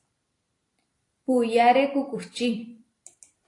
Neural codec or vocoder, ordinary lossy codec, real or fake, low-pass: vocoder, 44.1 kHz, 128 mel bands every 256 samples, BigVGAN v2; AAC, 48 kbps; fake; 10.8 kHz